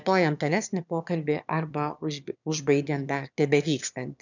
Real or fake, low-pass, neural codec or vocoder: fake; 7.2 kHz; autoencoder, 22.05 kHz, a latent of 192 numbers a frame, VITS, trained on one speaker